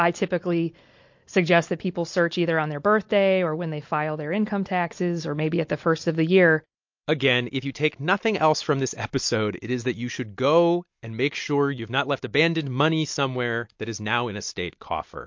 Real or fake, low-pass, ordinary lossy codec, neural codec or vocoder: real; 7.2 kHz; MP3, 48 kbps; none